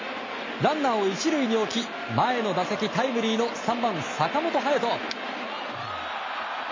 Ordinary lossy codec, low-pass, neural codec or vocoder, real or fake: MP3, 32 kbps; 7.2 kHz; none; real